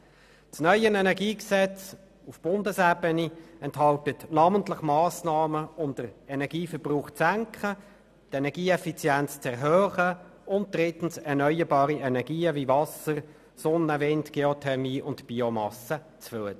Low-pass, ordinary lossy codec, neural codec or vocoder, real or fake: 14.4 kHz; none; none; real